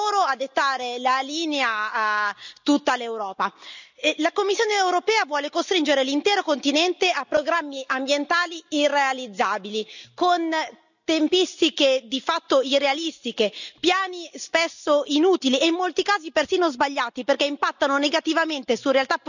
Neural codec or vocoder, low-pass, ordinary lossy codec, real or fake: none; 7.2 kHz; none; real